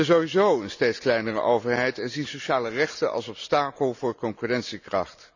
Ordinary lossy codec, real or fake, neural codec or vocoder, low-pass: none; real; none; 7.2 kHz